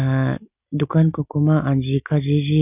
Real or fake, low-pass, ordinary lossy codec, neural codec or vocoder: real; 3.6 kHz; none; none